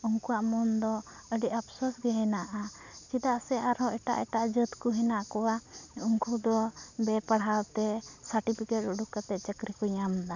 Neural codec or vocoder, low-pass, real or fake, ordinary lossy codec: none; 7.2 kHz; real; none